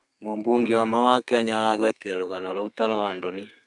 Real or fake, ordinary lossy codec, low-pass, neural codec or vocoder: fake; none; 10.8 kHz; codec, 32 kHz, 1.9 kbps, SNAC